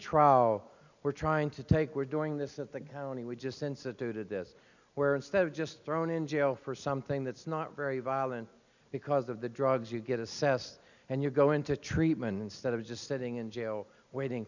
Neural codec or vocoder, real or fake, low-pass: none; real; 7.2 kHz